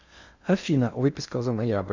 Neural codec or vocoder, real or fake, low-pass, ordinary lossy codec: codec, 16 kHz in and 24 kHz out, 0.6 kbps, FocalCodec, streaming, 2048 codes; fake; 7.2 kHz; Opus, 64 kbps